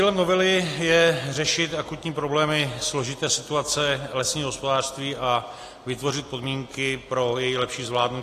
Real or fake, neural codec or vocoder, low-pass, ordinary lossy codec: real; none; 14.4 kHz; AAC, 48 kbps